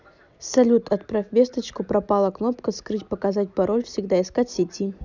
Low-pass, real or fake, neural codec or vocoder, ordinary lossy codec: 7.2 kHz; real; none; none